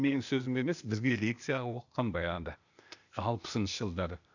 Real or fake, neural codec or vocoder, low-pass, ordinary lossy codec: fake; codec, 16 kHz, 0.8 kbps, ZipCodec; 7.2 kHz; none